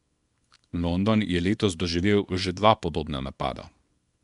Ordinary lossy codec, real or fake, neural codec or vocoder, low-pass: none; fake; codec, 24 kHz, 0.9 kbps, WavTokenizer, small release; 10.8 kHz